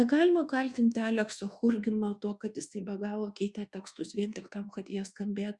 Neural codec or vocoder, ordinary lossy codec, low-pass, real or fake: codec, 24 kHz, 1.2 kbps, DualCodec; Opus, 64 kbps; 10.8 kHz; fake